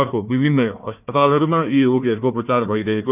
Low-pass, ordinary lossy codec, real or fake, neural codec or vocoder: 3.6 kHz; none; fake; codec, 16 kHz, 1 kbps, FunCodec, trained on Chinese and English, 50 frames a second